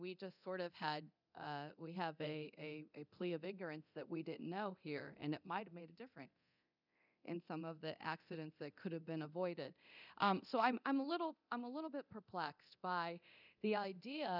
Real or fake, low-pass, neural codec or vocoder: fake; 5.4 kHz; codec, 24 kHz, 0.9 kbps, DualCodec